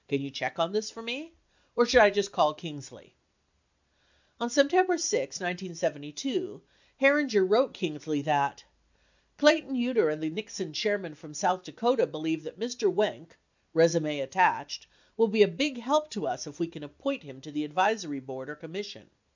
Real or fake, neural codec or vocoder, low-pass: real; none; 7.2 kHz